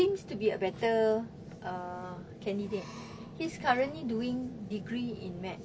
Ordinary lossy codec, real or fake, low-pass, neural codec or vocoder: none; real; none; none